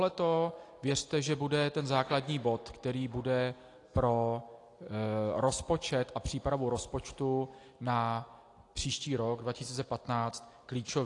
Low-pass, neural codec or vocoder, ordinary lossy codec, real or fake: 10.8 kHz; none; AAC, 48 kbps; real